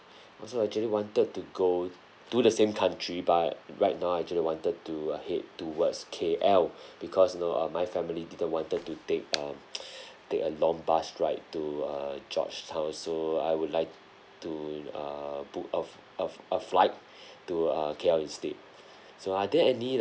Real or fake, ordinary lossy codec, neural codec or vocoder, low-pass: real; none; none; none